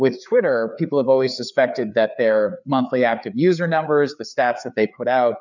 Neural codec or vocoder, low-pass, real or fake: codec, 16 kHz, 4 kbps, FreqCodec, larger model; 7.2 kHz; fake